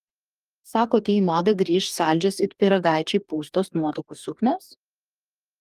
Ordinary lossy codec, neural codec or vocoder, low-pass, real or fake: Opus, 32 kbps; codec, 44.1 kHz, 2.6 kbps, DAC; 14.4 kHz; fake